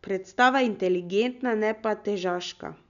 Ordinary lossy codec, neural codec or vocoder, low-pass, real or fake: none; none; 7.2 kHz; real